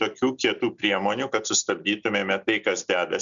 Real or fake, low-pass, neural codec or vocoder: real; 7.2 kHz; none